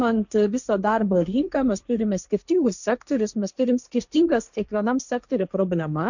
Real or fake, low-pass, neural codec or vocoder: fake; 7.2 kHz; codec, 16 kHz, 1.1 kbps, Voila-Tokenizer